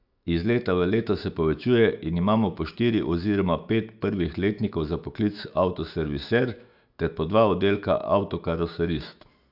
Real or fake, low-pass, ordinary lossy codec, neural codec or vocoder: fake; 5.4 kHz; AAC, 48 kbps; autoencoder, 48 kHz, 128 numbers a frame, DAC-VAE, trained on Japanese speech